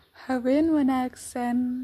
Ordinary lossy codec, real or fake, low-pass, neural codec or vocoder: MP3, 64 kbps; real; 14.4 kHz; none